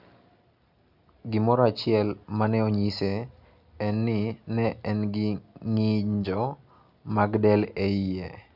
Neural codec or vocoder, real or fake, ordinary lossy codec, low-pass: none; real; none; 5.4 kHz